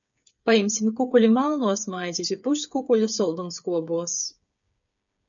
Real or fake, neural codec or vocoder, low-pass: fake; codec, 16 kHz, 8 kbps, FreqCodec, smaller model; 7.2 kHz